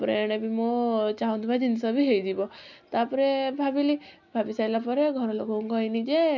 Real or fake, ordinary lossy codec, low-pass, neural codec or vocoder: real; none; 7.2 kHz; none